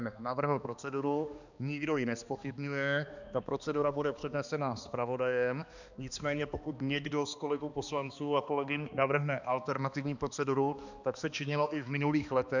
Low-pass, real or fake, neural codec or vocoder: 7.2 kHz; fake; codec, 16 kHz, 2 kbps, X-Codec, HuBERT features, trained on balanced general audio